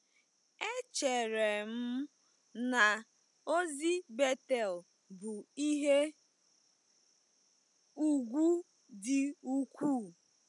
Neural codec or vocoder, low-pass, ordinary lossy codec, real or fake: none; 10.8 kHz; none; real